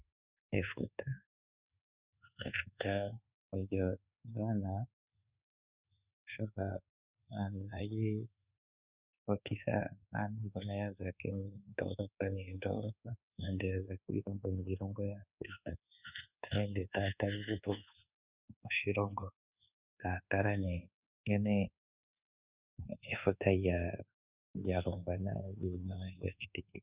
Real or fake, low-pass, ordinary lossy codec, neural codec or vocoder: fake; 3.6 kHz; Opus, 64 kbps; codec, 24 kHz, 1.2 kbps, DualCodec